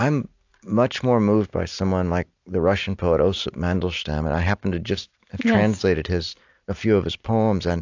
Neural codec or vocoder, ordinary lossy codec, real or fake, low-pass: none; AAC, 48 kbps; real; 7.2 kHz